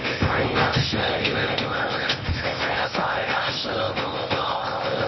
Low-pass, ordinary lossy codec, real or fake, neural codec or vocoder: 7.2 kHz; MP3, 24 kbps; fake; codec, 16 kHz in and 24 kHz out, 0.8 kbps, FocalCodec, streaming, 65536 codes